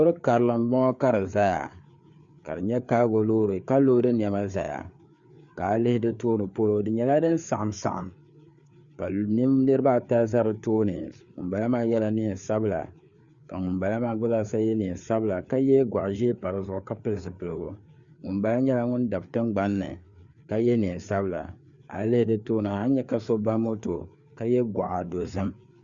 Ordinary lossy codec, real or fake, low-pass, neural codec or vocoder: Opus, 64 kbps; fake; 7.2 kHz; codec, 16 kHz, 4 kbps, FreqCodec, larger model